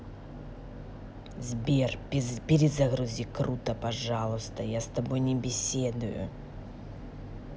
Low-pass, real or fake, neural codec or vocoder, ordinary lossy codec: none; real; none; none